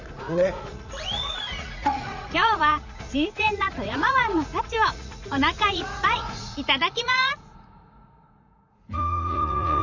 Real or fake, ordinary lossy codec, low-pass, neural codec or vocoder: fake; none; 7.2 kHz; vocoder, 44.1 kHz, 80 mel bands, Vocos